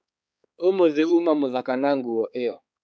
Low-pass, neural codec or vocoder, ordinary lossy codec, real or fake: none; codec, 16 kHz, 4 kbps, X-Codec, HuBERT features, trained on balanced general audio; none; fake